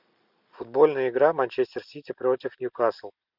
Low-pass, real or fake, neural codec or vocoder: 5.4 kHz; real; none